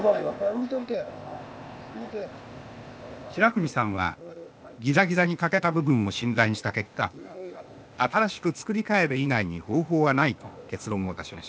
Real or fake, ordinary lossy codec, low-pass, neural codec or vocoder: fake; none; none; codec, 16 kHz, 0.8 kbps, ZipCodec